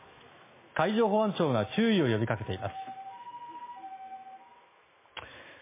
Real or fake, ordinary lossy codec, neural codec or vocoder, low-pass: fake; MP3, 16 kbps; autoencoder, 48 kHz, 128 numbers a frame, DAC-VAE, trained on Japanese speech; 3.6 kHz